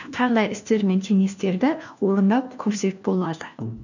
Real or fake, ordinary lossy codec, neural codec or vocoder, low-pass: fake; none; codec, 16 kHz, 1 kbps, FunCodec, trained on LibriTTS, 50 frames a second; 7.2 kHz